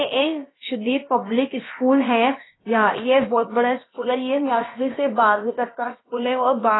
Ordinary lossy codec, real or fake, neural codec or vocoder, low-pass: AAC, 16 kbps; fake; codec, 16 kHz, about 1 kbps, DyCAST, with the encoder's durations; 7.2 kHz